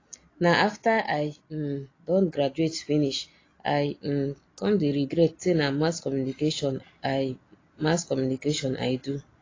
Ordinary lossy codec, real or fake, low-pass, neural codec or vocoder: AAC, 32 kbps; real; 7.2 kHz; none